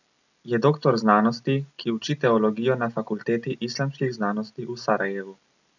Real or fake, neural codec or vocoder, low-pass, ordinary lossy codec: real; none; 7.2 kHz; none